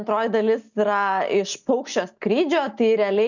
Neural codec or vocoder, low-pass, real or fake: none; 7.2 kHz; real